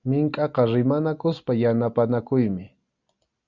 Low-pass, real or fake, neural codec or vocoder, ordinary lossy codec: 7.2 kHz; real; none; Opus, 64 kbps